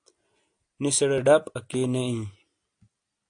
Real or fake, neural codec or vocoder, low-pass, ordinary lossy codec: real; none; 9.9 kHz; AAC, 48 kbps